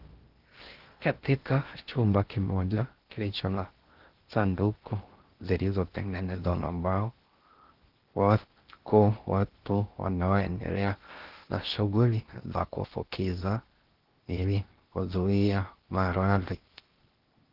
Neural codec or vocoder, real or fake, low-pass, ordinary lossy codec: codec, 16 kHz in and 24 kHz out, 0.6 kbps, FocalCodec, streaming, 2048 codes; fake; 5.4 kHz; Opus, 16 kbps